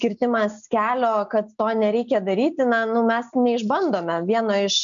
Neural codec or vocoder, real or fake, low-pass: none; real; 7.2 kHz